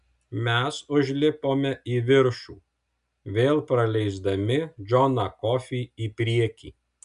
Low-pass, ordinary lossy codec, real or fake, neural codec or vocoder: 10.8 kHz; MP3, 96 kbps; real; none